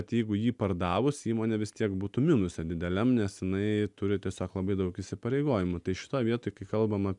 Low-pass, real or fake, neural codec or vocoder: 10.8 kHz; real; none